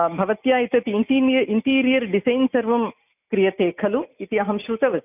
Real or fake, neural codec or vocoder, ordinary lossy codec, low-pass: real; none; none; 3.6 kHz